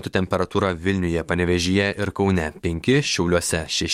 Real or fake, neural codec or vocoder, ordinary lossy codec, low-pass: fake; codec, 44.1 kHz, 7.8 kbps, DAC; MP3, 64 kbps; 19.8 kHz